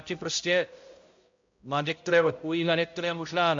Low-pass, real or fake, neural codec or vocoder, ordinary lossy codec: 7.2 kHz; fake; codec, 16 kHz, 0.5 kbps, X-Codec, HuBERT features, trained on balanced general audio; MP3, 48 kbps